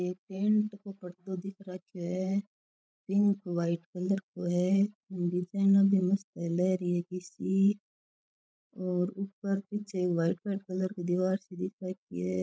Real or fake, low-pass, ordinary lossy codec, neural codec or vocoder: fake; none; none; codec, 16 kHz, 16 kbps, FreqCodec, larger model